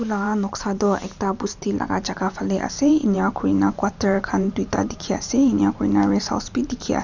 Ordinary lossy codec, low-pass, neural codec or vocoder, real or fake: none; 7.2 kHz; vocoder, 44.1 kHz, 128 mel bands every 512 samples, BigVGAN v2; fake